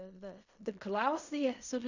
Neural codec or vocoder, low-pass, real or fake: codec, 16 kHz in and 24 kHz out, 0.4 kbps, LongCat-Audio-Codec, fine tuned four codebook decoder; 7.2 kHz; fake